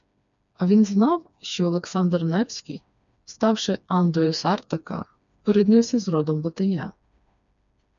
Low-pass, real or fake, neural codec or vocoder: 7.2 kHz; fake; codec, 16 kHz, 2 kbps, FreqCodec, smaller model